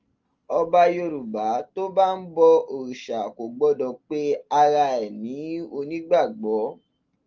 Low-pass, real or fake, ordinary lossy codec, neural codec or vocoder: 7.2 kHz; real; Opus, 24 kbps; none